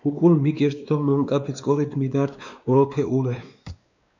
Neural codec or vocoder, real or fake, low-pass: codec, 16 kHz, 2 kbps, X-Codec, WavLM features, trained on Multilingual LibriSpeech; fake; 7.2 kHz